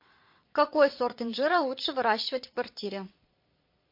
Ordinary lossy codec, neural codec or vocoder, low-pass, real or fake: MP3, 32 kbps; vocoder, 22.05 kHz, 80 mel bands, Vocos; 5.4 kHz; fake